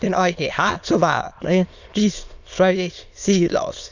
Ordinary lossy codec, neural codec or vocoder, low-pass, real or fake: none; autoencoder, 22.05 kHz, a latent of 192 numbers a frame, VITS, trained on many speakers; 7.2 kHz; fake